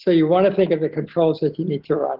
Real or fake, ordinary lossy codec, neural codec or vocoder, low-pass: real; Opus, 16 kbps; none; 5.4 kHz